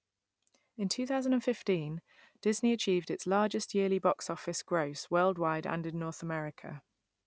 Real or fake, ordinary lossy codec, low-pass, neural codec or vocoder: real; none; none; none